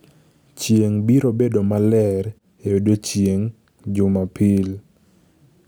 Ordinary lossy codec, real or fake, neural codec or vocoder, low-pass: none; real; none; none